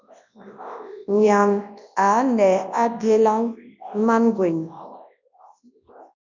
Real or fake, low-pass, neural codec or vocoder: fake; 7.2 kHz; codec, 24 kHz, 0.9 kbps, WavTokenizer, large speech release